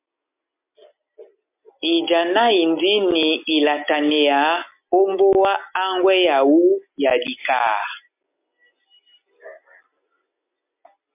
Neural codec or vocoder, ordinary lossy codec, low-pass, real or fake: none; MP3, 32 kbps; 3.6 kHz; real